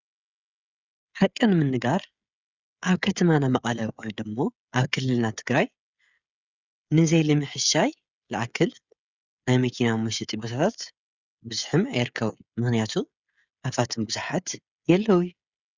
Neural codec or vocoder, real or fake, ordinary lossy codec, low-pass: codec, 16 kHz, 16 kbps, FreqCodec, smaller model; fake; Opus, 64 kbps; 7.2 kHz